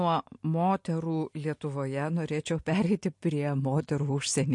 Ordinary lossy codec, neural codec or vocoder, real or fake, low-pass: MP3, 48 kbps; none; real; 10.8 kHz